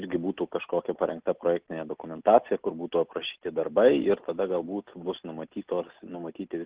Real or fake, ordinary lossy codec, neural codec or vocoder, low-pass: real; Opus, 16 kbps; none; 3.6 kHz